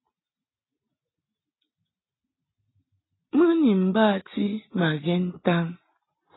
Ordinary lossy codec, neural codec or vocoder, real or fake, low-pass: AAC, 16 kbps; none; real; 7.2 kHz